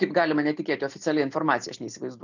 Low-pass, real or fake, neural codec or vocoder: 7.2 kHz; real; none